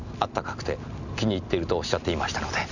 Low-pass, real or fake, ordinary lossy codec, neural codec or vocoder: 7.2 kHz; real; none; none